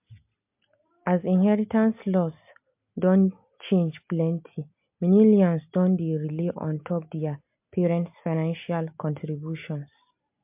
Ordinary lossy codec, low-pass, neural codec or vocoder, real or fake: MP3, 32 kbps; 3.6 kHz; none; real